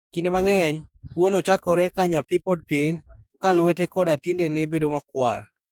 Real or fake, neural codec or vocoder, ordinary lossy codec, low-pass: fake; codec, 44.1 kHz, 2.6 kbps, DAC; none; 19.8 kHz